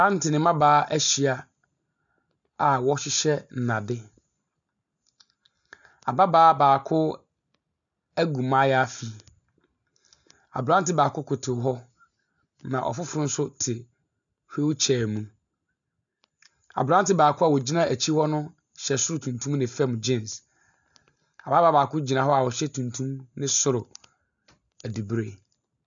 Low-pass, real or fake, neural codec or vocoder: 7.2 kHz; real; none